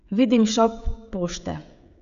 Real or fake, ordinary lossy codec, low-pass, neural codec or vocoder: fake; MP3, 96 kbps; 7.2 kHz; codec, 16 kHz, 16 kbps, FreqCodec, smaller model